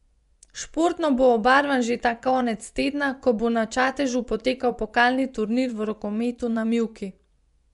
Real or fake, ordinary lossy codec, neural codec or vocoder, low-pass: fake; none; vocoder, 24 kHz, 100 mel bands, Vocos; 10.8 kHz